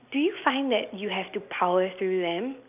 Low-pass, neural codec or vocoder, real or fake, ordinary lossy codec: 3.6 kHz; none; real; none